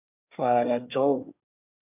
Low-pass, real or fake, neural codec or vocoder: 3.6 kHz; fake; codec, 24 kHz, 1 kbps, SNAC